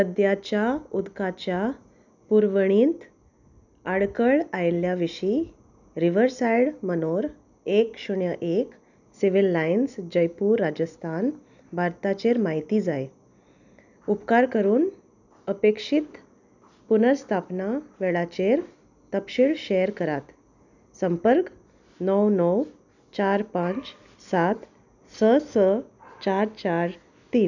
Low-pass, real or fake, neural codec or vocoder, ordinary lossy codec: 7.2 kHz; real; none; none